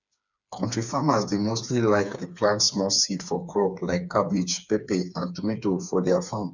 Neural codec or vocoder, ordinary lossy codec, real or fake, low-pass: codec, 16 kHz, 4 kbps, FreqCodec, smaller model; none; fake; 7.2 kHz